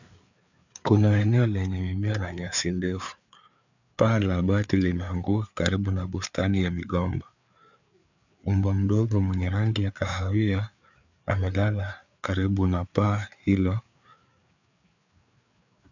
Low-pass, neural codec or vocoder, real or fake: 7.2 kHz; codec, 16 kHz, 4 kbps, FreqCodec, larger model; fake